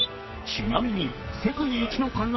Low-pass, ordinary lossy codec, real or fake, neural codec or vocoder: 7.2 kHz; MP3, 24 kbps; fake; codec, 44.1 kHz, 2.6 kbps, SNAC